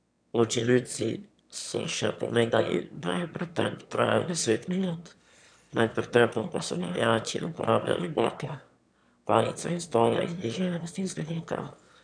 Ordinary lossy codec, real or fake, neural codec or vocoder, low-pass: none; fake; autoencoder, 22.05 kHz, a latent of 192 numbers a frame, VITS, trained on one speaker; 9.9 kHz